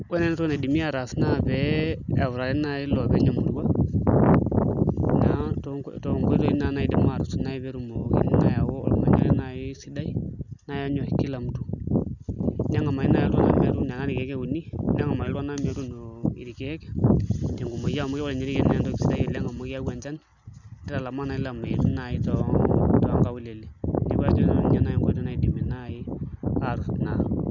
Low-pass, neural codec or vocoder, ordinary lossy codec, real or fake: 7.2 kHz; none; none; real